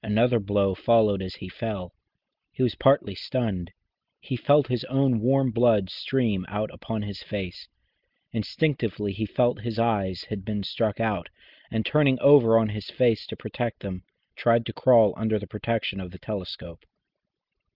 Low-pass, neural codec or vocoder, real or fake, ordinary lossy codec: 5.4 kHz; none; real; Opus, 32 kbps